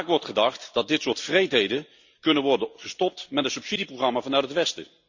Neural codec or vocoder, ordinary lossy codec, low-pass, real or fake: none; Opus, 64 kbps; 7.2 kHz; real